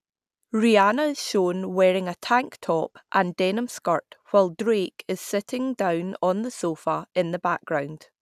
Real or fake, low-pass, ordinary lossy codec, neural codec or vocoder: real; 14.4 kHz; none; none